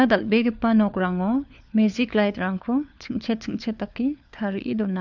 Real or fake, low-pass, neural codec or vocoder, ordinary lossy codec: fake; 7.2 kHz; codec, 16 kHz, 4 kbps, FunCodec, trained on LibriTTS, 50 frames a second; none